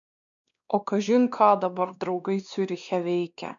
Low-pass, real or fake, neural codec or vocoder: 7.2 kHz; fake; codec, 16 kHz, 2 kbps, X-Codec, WavLM features, trained on Multilingual LibriSpeech